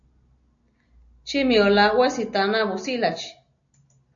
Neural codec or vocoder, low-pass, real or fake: none; 7.2 kHz; real